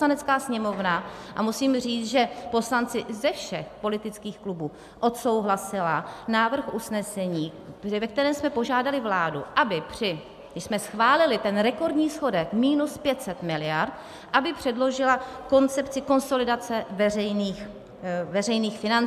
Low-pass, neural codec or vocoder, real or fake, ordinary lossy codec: 14.4 kHz; none; real; AAC, 96 kbps